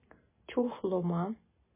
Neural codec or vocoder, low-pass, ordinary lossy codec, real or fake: none; 3.6 kHz; MP3, 16 kbps; real